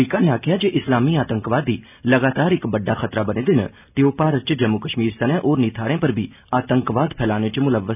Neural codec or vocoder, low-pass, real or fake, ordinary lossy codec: none; 3.6 kHz; real; none